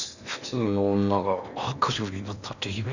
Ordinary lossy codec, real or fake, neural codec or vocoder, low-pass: none; fake; codec, 16 kHz in and 24 kHz out, 0.8 kbps, FocalCodec, streaming, 65536 codes; 7.2 kHz